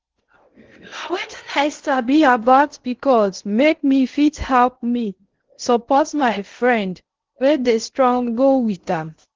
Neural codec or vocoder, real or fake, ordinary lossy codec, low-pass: codec, 16 kHz in and 24 kHz out, 0.6 kbps, FocalCodec, streaming, 4096 codes; fake; Opus, 16 kbps; 7.2 kHz